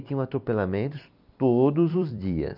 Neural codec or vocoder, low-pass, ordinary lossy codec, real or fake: vocoder, 44.1 kHz, 128 mel bands every 256 samples, BigVGAN v2; 5.4 kHz; none; fake